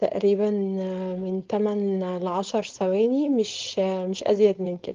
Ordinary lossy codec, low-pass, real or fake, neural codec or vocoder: Opus, 32 kbps; 7.2 kHz; fake; codec, 16 kHz, 4.8 kbps, FACodec